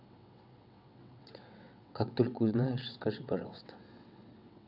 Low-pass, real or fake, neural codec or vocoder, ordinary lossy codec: 5.4 kHz; fake; vocoder, 22.05 kHz, 80 mel bands, WaveNeXt; none